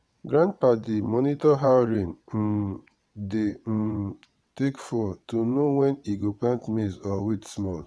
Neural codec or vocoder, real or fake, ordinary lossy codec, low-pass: vocoder, 22.05 kHz, 80 mel bands, WaveNeXt; fake; none; none